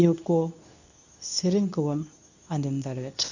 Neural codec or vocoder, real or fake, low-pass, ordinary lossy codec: codec, 24 kHz, 0.9 kbps, WavTokenizer, medium speech release version 2; fake; 7.2 kHz; none